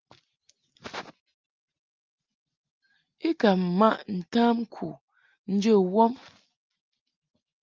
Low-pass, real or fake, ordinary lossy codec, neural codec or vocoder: 7.2 kHz; real; Opus, 32 kbps; none